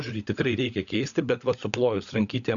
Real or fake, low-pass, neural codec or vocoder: fake; 7.2 kHz; codec, 16 kHz, 16 kbps, FunCodec, trained on LibriTTS, 50 frames a second